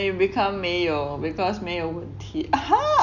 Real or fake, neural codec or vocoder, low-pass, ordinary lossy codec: real; none; 7.2 kHz; none